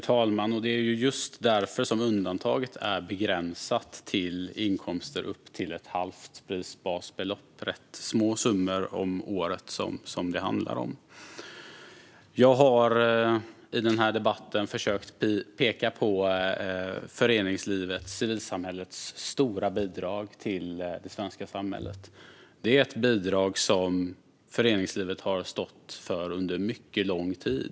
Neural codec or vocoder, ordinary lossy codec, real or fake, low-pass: none; none; real; none